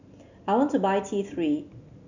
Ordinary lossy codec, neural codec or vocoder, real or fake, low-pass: none; none; real; 7.2 kHz